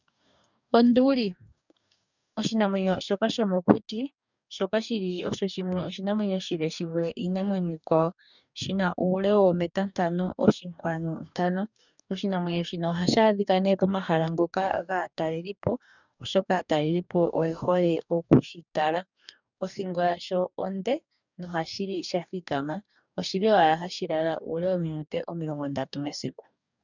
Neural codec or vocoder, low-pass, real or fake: codec, 44.1 kHz, 2.6 kbps, DAC; 7.2 kHz; fake